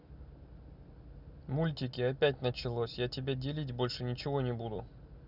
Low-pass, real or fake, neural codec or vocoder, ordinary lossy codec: 5.4 kHz; real; none; Opus, 64 kbps